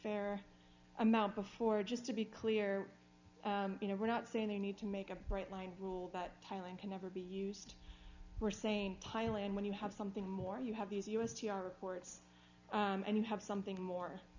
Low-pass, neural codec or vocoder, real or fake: 7.2 kHz; none; real